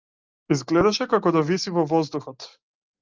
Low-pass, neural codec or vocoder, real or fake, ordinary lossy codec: 7.2 kHz; none; real; Opus, 24 kbps